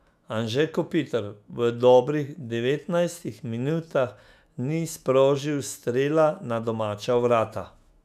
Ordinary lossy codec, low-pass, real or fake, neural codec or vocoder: none; 14.4 kHz; fake; autoencoder, 48 kHz, 128 numbers a frame, DAC-VAE, trained on Japanese speech